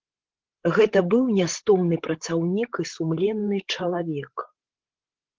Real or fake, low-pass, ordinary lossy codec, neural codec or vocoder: fake; 7.2 kHz; Opus, 16 kbps; codec, 16 kHz, 16 kbps, FreqCodec, larger model